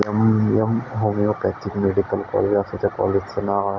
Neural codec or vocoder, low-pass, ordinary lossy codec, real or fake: none; 7.2 kHz; none; real